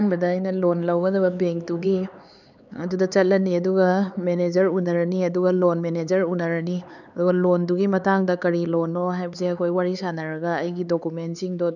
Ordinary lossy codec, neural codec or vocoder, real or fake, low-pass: none; codec, 16 kHz, 4 kbps, X-Codec, HuBERT features, trained on LibriSpeech; fake; 7.2 kHz